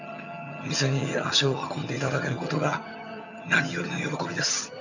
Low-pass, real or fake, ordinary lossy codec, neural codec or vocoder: 7.2 kHz; fake; none; vocoder, 22.05 kHz, 80 mel bands, HiFi-GAN